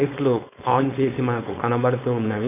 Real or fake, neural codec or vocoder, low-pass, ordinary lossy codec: fake; codec, 16 kHz, 4.8 kbps, FACodec; 3.6 kHz; none